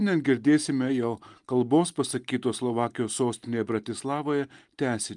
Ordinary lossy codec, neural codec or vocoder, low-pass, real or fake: Opus, 32 kbps; none; 10.8 kHz; real